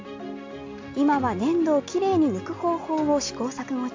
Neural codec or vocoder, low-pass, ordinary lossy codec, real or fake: none; 7.2 kHz; AAC, 48 kbps; real